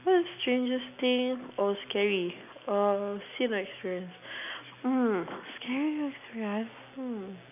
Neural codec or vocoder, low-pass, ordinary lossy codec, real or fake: none; 3.6 kHz; none; real